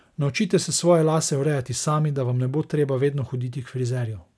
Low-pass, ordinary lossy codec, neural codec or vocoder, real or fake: none; none; none; real